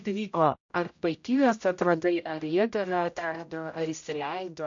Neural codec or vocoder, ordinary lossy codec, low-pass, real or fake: codec, 16 kHz, 0.5 kbps, X-Codec, HuBERT features, trained on general audio; AAC, 64 kbps; 7.2 kHz; fake